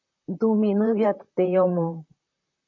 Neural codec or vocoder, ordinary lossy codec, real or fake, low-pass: vocoder, 44.1 kHz, 128 mel bands, Pupu-Vocoder; MP3, 48 kbps; fake; 7.2 kHz